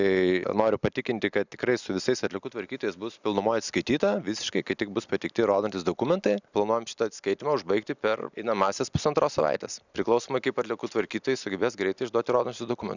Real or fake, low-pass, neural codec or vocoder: real; 7.2 kHz; none